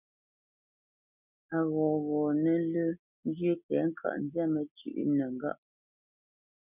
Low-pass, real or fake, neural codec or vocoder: 3.6 kHz; real; none